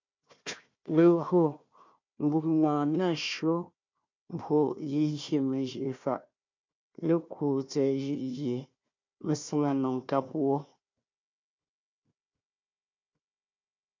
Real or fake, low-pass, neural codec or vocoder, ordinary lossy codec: fake; 7.2 kHz; codec, 16 kHz, 1 kbps, FunCodec, trained on Chinese and English, 50 frames a second; MP3, 64 kbps